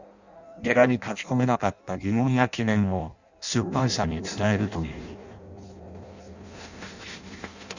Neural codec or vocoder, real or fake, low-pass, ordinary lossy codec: codec, 16 kHz in and 24 kHz out, 0.6 kbps, FireRedTTS-2 codec; fake; 7.2 kHz; none